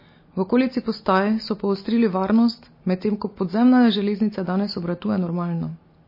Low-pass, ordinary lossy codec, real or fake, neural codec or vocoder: 5.4 kHz; MP3, 24 kbps; real; none